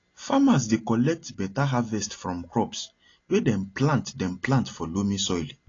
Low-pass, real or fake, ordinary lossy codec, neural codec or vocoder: 7.2 kHz; real; AAC, 32 kbps; none